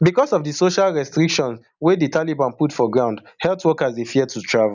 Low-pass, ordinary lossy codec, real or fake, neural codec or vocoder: 7.2 kHz; none; real; none